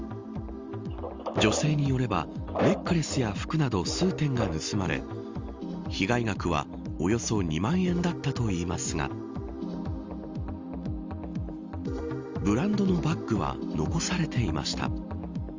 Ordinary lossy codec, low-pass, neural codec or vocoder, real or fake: Opus, 32 kbps; 7.2 kHz; none; real